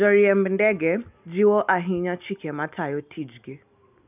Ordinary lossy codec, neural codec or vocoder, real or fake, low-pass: none; none; real; 3.6 kHz